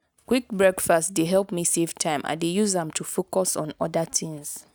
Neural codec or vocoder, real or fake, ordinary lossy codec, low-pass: none; real; none; none